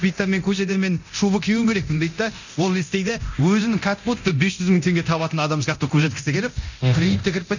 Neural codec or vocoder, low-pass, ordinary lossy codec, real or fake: codec, 24 kHz, 0.9 kbps, DualCodec; 7.2 kHz; none; fake